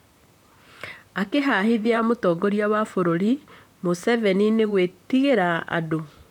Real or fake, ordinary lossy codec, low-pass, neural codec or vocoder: fake; none; 19.8 kHz; vocoder, 44.1 kHz, 128 mel bands, Pupu-Vocoder